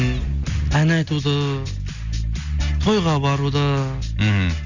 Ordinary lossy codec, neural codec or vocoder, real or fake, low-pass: Opus, 64 kbps; none; real; 7.2 kHz